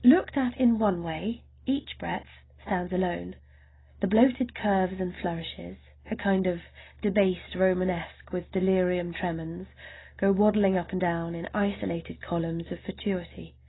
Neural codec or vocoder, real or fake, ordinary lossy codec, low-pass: none; real; AAC, 16 kbps; 7.2 kHz